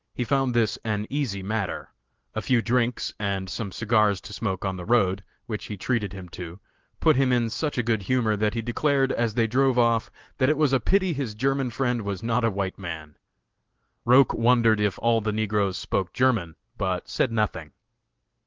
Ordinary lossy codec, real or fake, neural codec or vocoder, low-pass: Opus, 16 kbps; real; none; 7.2 kHz